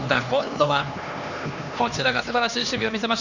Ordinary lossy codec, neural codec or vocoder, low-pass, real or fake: none; codec, 16 kHz, 2 kbps, X-Codec, HuBERT features, trained on LibriSpeech; 7.2 kHz; fake